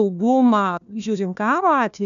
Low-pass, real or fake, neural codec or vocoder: 7.2 kHz; fake; codec, 16 kHz, 1 kbps, FunCodec, trained on Chinese and English, 50 frames a second